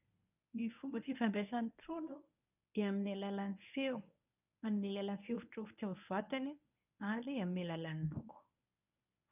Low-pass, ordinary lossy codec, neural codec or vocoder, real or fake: 3.6 kHz; none; codec, 24 kHz, 0.9 kbps, WavTokenizer, medium speech release version 1; fake